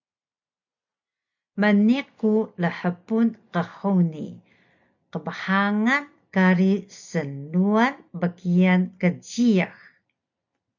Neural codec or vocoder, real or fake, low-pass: none; real; 7.2 kHz